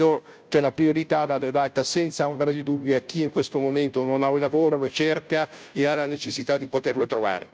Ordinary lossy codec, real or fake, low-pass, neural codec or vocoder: none; fake; none; codec, 16 kHz, 0.5 kbps, FunCodec, trained on Chinese and English, 25 frames a second